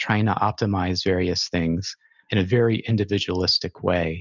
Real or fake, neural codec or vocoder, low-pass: real; none; 7.2 kHz